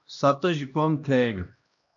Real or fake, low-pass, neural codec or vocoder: fake; 7.2 kHz; codec, 16 kHz, 1 kbps, X-Codec, HuBERT features, trained on LibriSpeech